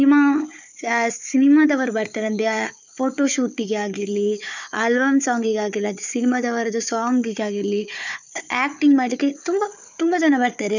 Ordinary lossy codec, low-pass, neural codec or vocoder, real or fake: none; 7.2 kHz; codec, 16 kHz, 4 kbps, FunCodec, trained on Chinese and English, 50 frames a second; fake